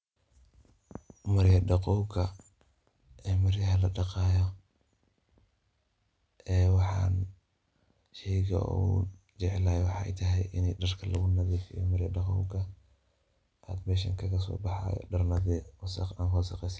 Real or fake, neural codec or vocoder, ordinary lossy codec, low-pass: real; none; none; none